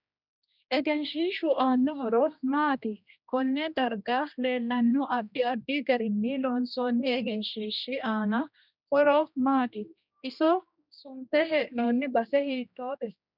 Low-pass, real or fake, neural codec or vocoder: 5.4 kHz; fake; codec, 16 kHz, 1 kbps, X-Codec, HuBERT features, trained on general audio